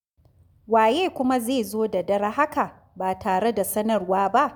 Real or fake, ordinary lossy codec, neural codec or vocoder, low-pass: real; none; none; none